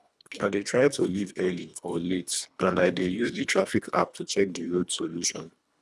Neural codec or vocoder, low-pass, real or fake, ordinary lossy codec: codec, 24 kHz, 1.5 kbps, HILCodec; none; fake; none